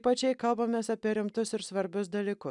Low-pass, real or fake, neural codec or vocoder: 10.8 kHz; real; none